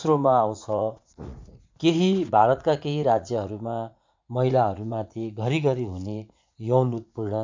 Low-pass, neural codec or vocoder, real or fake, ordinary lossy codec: 7.2 kHz; vocoder, 44.1 kHz, 80 mel bands, Vocos; fake; MP3, 64 kbps